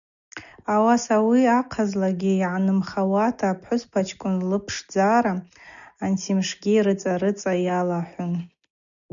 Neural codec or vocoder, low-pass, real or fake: none; 7.2 kHz; real